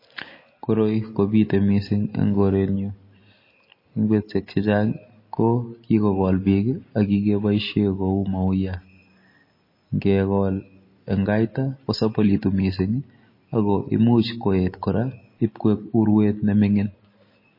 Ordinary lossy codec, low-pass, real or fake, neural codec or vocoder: MP3, 24 kbps; 5.4 kHz; real; none